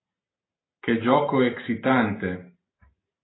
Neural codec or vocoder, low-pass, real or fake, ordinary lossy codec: none; 7.2 kHz; real; AAC, 16 kbps